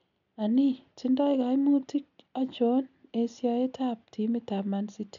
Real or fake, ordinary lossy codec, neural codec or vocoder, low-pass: real; none; none; 7.2 kHz